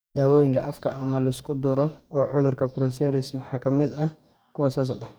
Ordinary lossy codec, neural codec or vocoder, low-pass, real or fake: none; codec, 44.1 kHz, 2.6 kbps, DAC; none; fake